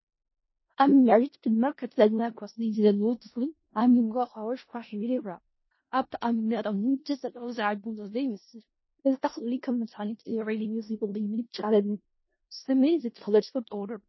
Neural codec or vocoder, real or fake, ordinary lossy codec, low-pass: codec, 16 kHz in and 24 kHz out, 0.4 kbps, LongCat-Audio-Codec, four codebook decoder; fake; MP3, 24 kbps; 7.2 kHz